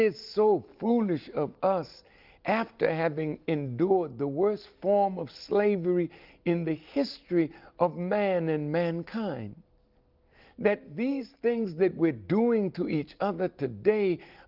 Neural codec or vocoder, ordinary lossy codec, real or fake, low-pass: none; Opus, 32 kbps; real; 5.4 kHz